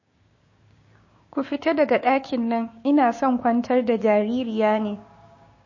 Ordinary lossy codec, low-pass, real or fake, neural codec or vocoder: MP3, 32 kbps; 7.2 kHz; fake; codec, 16 kHz, 6 kbps, DAC